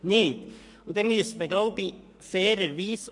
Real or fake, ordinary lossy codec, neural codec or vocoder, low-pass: fake; MP3, 64 kbps; codec, 44.1 kHz, 2.6 kbps, SNAC; 10.8 kHz